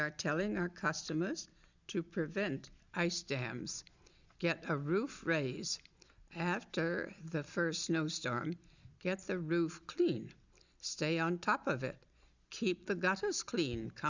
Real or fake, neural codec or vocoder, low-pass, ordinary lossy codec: real; none; 7.2 kHz; Opus, 64 kbps